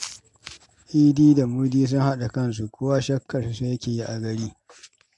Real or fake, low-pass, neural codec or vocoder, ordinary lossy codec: real; 10.8 kHz; none; MP3, 64 kbps